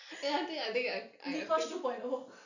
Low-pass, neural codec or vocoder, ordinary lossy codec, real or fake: 7.2 kHz; none; none; real